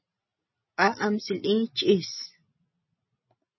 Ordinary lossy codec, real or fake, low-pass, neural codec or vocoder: MP3, 24 kbps; real; 7.2 kHz; none